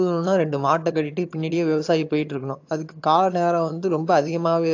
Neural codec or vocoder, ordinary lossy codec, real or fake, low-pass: vocoder, 22.05 kHz, 80 mel bands, HiFi-GAN; AAC, 48 kbps; fake; 7.2 kHz